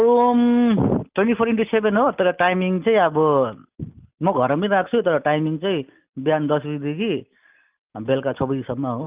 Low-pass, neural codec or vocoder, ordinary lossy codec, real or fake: 3.6 kHz; none; Opus, 24 kbps; real